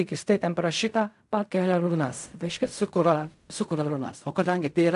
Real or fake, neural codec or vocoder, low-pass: fake; codec, 16 kHz in and 24 kHz out, 0.4 kbps, LongCat-Audio-Codec, fine tuned four codebook decoder; 10.8 kHz